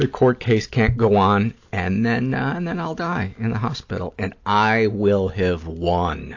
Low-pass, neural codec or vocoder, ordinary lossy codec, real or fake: 7.2 kHz; none; AAC, 48 kbps; real